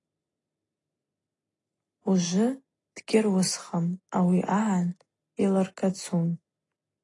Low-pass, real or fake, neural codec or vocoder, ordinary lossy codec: 10.8 kHz; real; none; AAC, 32 kbps